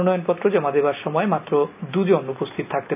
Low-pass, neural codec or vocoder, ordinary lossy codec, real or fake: 3.6 kHz; none; none; real